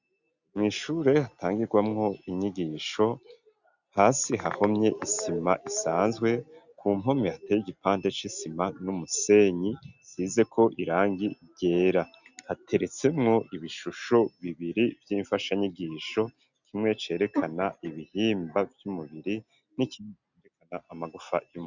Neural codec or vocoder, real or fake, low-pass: none; real; 7.2 kHz